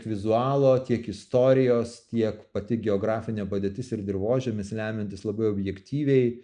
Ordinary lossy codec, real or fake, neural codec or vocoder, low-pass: MP3, 96 kbps; real; none; 9.9 kHz